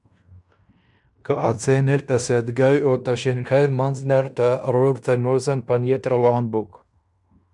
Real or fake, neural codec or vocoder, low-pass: fake; codec, 16 kHz in and 24 kHz out, 0.9 kbps, LongCat-Audio-Codec, fine tuned four codebook decoder; 10.8 kHz